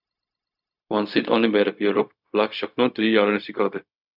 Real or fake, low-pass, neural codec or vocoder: fake; 5.4 kHz; codec, 16 kHz, 0.4 kbps, LongCat-Audio-Codec